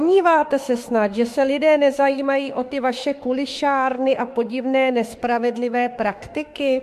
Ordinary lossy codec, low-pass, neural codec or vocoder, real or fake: MP3, 64 kbps; 14.4 kHz; autoencoder, 48 kHz, 32 numbers a frame, DAC-VAE, trained on Japanese speech; fake